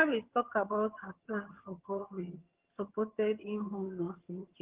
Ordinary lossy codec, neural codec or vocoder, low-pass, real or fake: Opus, 16 kbps; vocoder, 22.05 kHz, 80 mel bands, HiFi-GAN; 3.6 kHz; fake